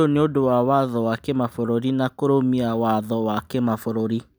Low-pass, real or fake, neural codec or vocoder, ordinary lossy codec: none; real; none; none